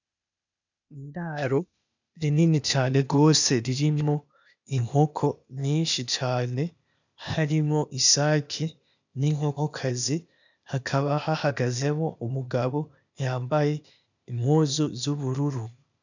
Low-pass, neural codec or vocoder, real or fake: 7.2 kHz; codec, 16 kHz, 0.8 kbps, ZipCodec; fake